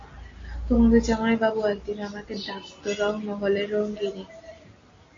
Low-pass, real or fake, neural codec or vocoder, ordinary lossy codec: 7.2 kHz; real; none; AAC, 32 kbps